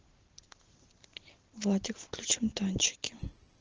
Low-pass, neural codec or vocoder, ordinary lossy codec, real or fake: 7.2 kHz; none; Opus, 16 kbps; real